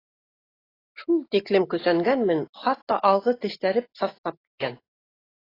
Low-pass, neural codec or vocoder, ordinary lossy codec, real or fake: 5.4 kHz; none; AAC, 24 kbps; real